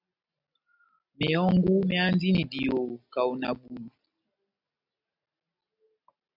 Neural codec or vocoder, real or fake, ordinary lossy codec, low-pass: none; real; AAC, 48 kbps; 5.4 kHz